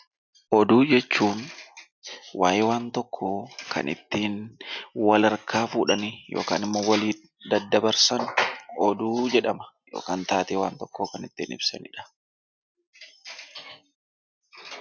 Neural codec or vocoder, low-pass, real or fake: none; 7.2 kHz; real